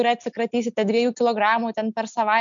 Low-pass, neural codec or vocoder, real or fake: 7.2 kHz; none; real